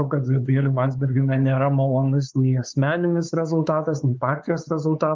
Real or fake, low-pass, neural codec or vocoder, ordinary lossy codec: fake; 7.2 kHz; codec, 16 kHz, 4 kbps, X-Codec, WavLM features, trained on Multilingual LibriSpeech; Opus, 32 kbps